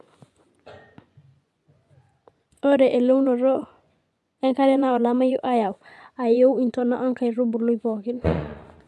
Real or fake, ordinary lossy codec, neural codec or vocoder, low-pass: fake; none; vocoder, 24 kHz, 100 mel bands, Vocos; none